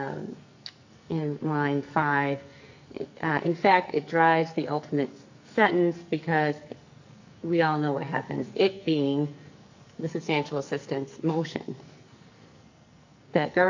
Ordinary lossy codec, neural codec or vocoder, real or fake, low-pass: AAC, 48 kbps; codec, 44.1 kHz, 2.6 kbps, SNAC; fake; 7.2 kHz